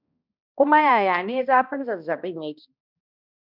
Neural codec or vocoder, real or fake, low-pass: codec, 16 kHz, 1 kbps, X-Codec, HuBERT features, trained on balanced general audio; fake; 5.4 kHz